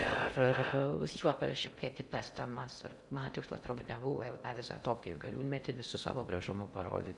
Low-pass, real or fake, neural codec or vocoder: 10.8 kHz; fake; codec, 16 kHz in and 24 kHz out, 0.6 kbps, FocalCodec, streaming, 4096 codes